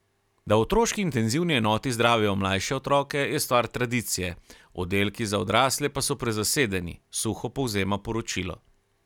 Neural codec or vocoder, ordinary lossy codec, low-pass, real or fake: none; none; 19.8 kHz; real